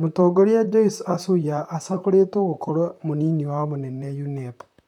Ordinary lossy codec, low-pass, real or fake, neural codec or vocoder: none; 19.8 kHz; fake; vocoder, 44.1 kHz, 128 mel bands, Pupu-Vocoder